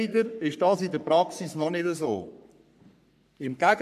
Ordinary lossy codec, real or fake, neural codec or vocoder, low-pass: MP3, 96 kbps; fake; codec, 44.1 kHz, 3.4 kbps, Pupu-Codec; 14.4 kHz